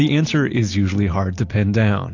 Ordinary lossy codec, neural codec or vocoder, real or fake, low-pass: AAC, 48 kbps; none; real; 7.2 kHz